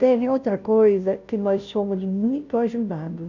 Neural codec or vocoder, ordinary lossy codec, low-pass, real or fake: codec, 16 kHz, 0.5 kbps, FunCodec, trained on Chinese and English, 25 frames a second; none; 7.2 kHz; fake